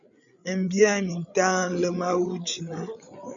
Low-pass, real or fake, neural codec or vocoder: 7.2 kHz; fake; codec, 16 kHz, 8 kbps, FreqCodec, larger model